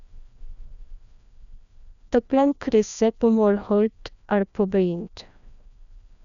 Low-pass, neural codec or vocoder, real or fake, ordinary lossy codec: 7.2 kHz; codec, 16 kHz, 1 kbps, FreqCodec, larger model; fake; none